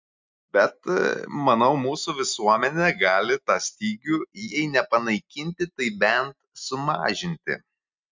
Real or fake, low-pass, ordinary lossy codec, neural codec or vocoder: real; 7.2 kHz; MP3, 64 kbps; none